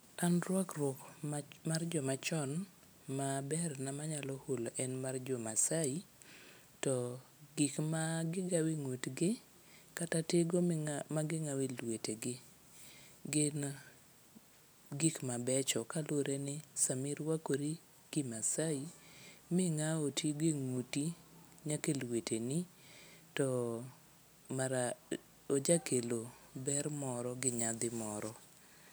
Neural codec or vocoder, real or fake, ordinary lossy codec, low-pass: none; real; none; none